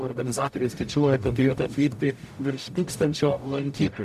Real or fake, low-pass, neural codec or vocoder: fake; 14.4 kHz; codec, 44.1 kHz, 0.9 kbps, DAC